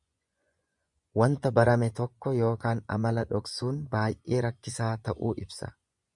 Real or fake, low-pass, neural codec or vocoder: fake; 10.8 kHz; vocoder, 24 kHz, 100 mel bands, Vocos